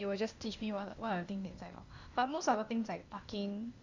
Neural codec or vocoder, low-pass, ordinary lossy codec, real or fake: codec, 16 kHz, 0.8 kbps, ZipCodec; 7.2 kHz; Opus, 64 kbps; fake